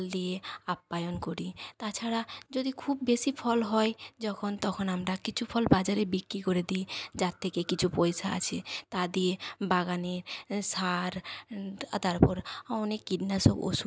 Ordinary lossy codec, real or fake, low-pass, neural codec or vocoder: none; real; none; none